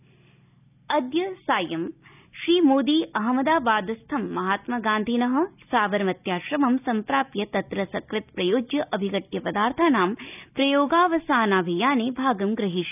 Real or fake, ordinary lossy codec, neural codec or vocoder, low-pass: real; none; none; 3.6 kHz